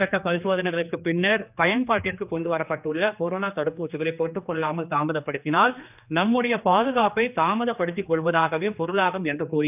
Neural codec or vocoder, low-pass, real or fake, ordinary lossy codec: codec, 16 kHz, 2 kbps, X-Codec, HuBERT features, trained on general audio; 3.6 kHz; fake; none